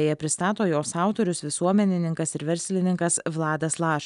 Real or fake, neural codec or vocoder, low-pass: real; none; 10.8 kHz